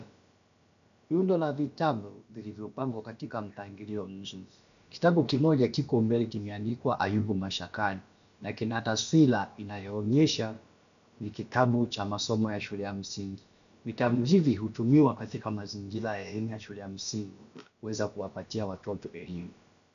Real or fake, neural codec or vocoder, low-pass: fake; codec, 16 kHz, about 1 kbps, DyCAST, with the encoder's durations; 7.2 kHz